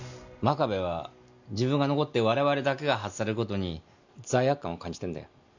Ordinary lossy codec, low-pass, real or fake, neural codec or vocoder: none; 7.2 kHz; real; none